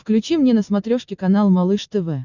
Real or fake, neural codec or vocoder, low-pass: real; none; 7.2 kHz